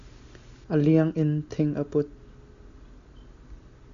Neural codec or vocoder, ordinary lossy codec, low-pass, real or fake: none; AAC, 64 kbps; 7.2 kHz; real